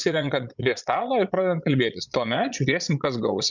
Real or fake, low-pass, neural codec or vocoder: fake; 7.2 kHz; vocoder, 22.05 kHz, 80 mel bands, Vocos